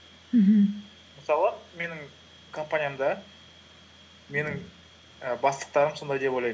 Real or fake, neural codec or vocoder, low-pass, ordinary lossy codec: real; none; none; none